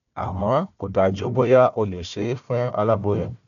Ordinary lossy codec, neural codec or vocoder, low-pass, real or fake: none; codec, 16 kHz, 1 kbps, FunCodec, trained on Chinese and English, 50 frames a second; 7.2 kHz; fake